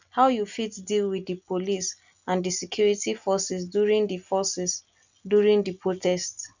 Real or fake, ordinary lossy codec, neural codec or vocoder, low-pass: real; none; none; 7.2 kHz